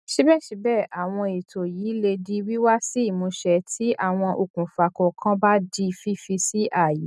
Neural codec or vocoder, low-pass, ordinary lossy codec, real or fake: none; none; none; real